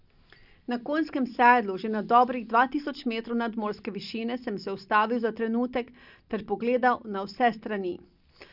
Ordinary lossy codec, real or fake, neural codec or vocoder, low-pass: none; real; none; 5.4 kHz